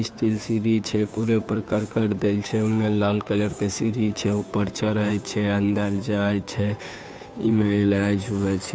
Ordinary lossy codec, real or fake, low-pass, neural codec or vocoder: none; fake; none; codec, 16 kHz, 2 kbps, FunCodec, trained on Chinese and English, 25 frames a second